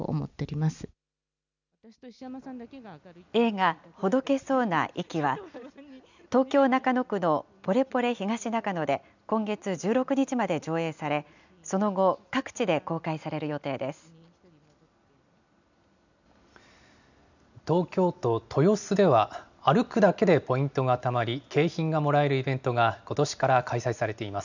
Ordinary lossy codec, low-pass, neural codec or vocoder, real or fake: none; 7.2 kHz; none; real